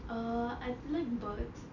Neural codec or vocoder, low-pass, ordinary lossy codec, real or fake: none; 7.2 kHz; none; real